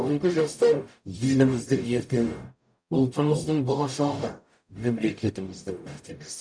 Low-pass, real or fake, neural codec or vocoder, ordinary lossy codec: 9.9 kHz; fake; codec, 44.1 kHz, 0.9 kbps, DAC; none